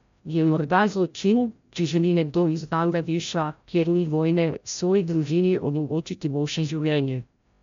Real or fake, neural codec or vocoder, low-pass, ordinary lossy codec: fake; codec, 16 kHz, 0.5 kbps, FreqCodec, larger model; 7.2 kHz; MP3, 64 kbps